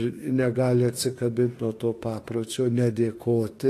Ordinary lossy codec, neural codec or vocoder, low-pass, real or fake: AAC, 48 kbps; autoencoder, 48 kHz, 32 numbers a frame, DAC-VAE, trained on Japanese speech; 14.4 kHz; fake